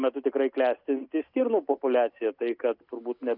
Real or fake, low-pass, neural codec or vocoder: real; 5.4 kHz; none